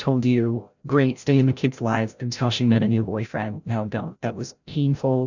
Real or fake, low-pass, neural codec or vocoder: fake; 7.2 kHz; codec, 16 kHz, 0.5 kbps, FreqCodec, larger model